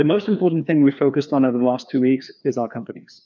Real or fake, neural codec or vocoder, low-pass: fake; codec, 16 kHz, 2 kbps, FreqCodec, larger model; 7.2 kHz